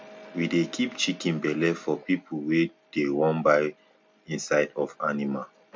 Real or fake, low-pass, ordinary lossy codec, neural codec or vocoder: real; none; none; none